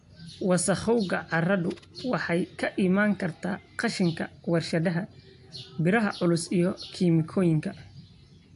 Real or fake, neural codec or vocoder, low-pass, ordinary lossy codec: real; none; 10.8 kHz; AAC, 64 kbps